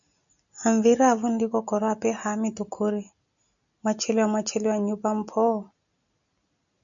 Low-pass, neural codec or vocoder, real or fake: 7.2 kHz; none; real